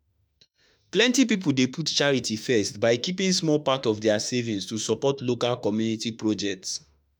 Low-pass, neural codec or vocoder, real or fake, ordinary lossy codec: none; autoencoder, 48 kHz, 32 numbers a frame, DAC-VAE, trained on Japanese speech; fake; none